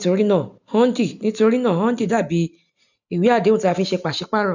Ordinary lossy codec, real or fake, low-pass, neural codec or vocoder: none; real; 7.2 kHz; none